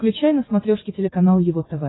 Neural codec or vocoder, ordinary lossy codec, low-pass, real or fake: codec, 44.1 kHz, 7.8 kbps, DAC; AAC, 16 kbps; 7.2 kHz; fake